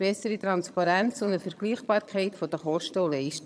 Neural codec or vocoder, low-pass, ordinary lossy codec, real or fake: vocoder, 22.05 kHz, 80 mel bands, HiFi-GAN; none; none; fake